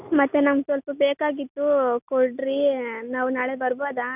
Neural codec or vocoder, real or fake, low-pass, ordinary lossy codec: none; real; 3.6 kHz; none